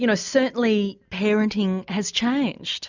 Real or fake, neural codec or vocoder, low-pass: real; none; 7.2 kHz